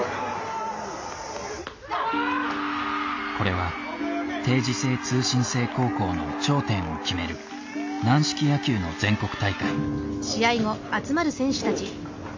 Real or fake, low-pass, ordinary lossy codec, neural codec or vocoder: real; 7.2 kHz; AAC, 48 kbps; none